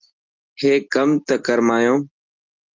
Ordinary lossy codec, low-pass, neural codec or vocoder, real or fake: Opus, 24 kbps; 7.2 kHz; none; real